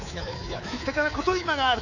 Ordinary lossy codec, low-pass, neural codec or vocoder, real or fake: none; 7.2 kHz; codec, 24 kHz, 3.1 kbps, DualCodec; fake